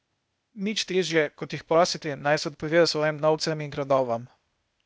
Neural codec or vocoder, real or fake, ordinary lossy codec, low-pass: codec, 16 kHz, 0.8 kbps, ZipCodec; fake; none; none